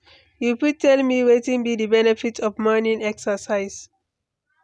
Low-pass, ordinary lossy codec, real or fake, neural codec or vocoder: none; none; real; none